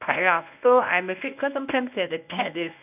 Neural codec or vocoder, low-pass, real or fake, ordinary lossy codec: codec, 24 kHz, 0.9 kbps, WavTokenizer, medium speech release version 1; 3.6 kHz; fake; none